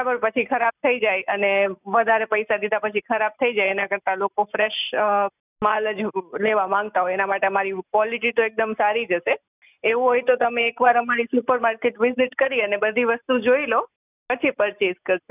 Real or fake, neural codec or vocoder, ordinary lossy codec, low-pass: real; none; none; 3.6 kHz